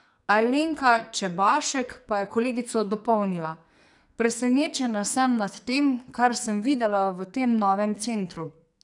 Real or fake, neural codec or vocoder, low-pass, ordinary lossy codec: fake; codec, 44.1 kHz, 2.6 kbps, SNAC; 10.8 kHz; none